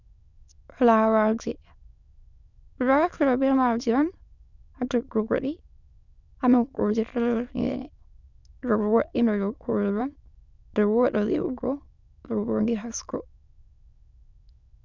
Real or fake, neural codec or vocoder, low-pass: fake; autoencoder, 22.05 kHz, a latent of 192 numbers a frame, VITS, trained on many speakers; 7.2 kHz